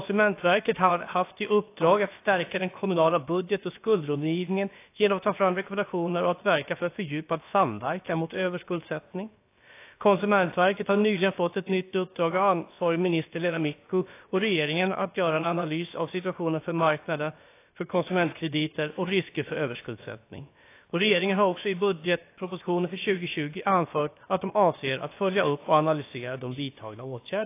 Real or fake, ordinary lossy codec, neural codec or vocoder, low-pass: fake; AAC, 24 kbps; codec, 16 kHz, about 1 kbps, DyCAST, with the encoder's durations; 3.6 kHz